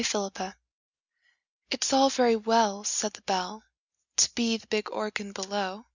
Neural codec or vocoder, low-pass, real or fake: none; 7.2 kHz; real